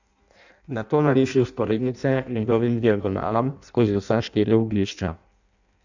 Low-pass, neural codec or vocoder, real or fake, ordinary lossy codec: 7.2 kHz; codec, 16 kHz in and 24 kHz out, 0.6 kbps, FireRedTTS-2 codec; fake; none